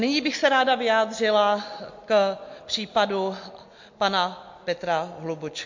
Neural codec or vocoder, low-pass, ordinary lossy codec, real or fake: none; 7.2 kHz; MP3, 48 kbps; real